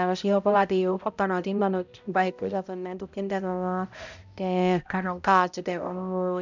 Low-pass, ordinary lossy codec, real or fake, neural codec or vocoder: 7.2 kHz; none; fake; codec, 16 kHz, 0.5 kbps, X-Codec, HuBERT features, trained on balanced general audio